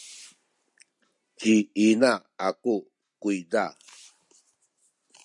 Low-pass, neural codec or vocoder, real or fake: 10.8 kHz; none; real